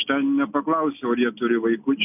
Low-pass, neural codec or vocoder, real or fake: 3.6 kHz; none; real